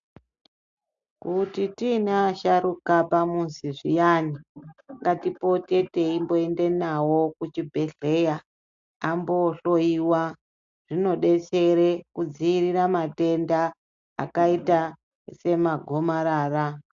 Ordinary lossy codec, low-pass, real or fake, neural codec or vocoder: AAC, 64 kbps; 7.2 kHz; real; none